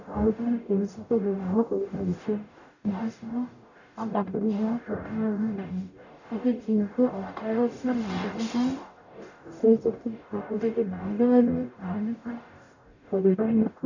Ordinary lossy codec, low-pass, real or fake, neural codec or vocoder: none; 7.2 kHz; fake; codec, 44.1 kHz, 0.9 kbps, DAC